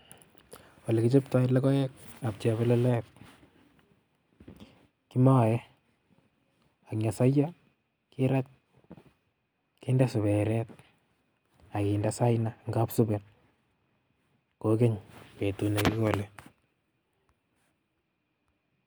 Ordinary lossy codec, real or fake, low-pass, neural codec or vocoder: none; real; none; none